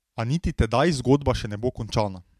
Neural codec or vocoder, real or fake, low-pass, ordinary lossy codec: none; real; 14.4 kHz; MP3, 96 kbps